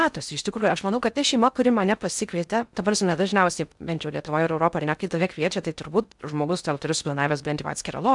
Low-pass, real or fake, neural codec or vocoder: 10.8 kHz; fake; codec, 16 kHz in and 24 kHz out, 0.6 kbps, FocalCodec, streaming, 2048 codes